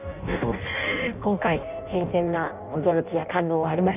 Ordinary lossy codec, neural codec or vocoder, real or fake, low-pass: none; codec, 16 kHz in and 24 kHz out, 0.6 kbps, FireRedTTS-2 codec; fake; 3.6 kHz